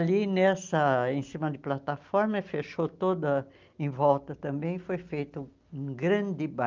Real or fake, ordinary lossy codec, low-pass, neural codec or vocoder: real; Opus, 24 kbps; 7.2 kHz; none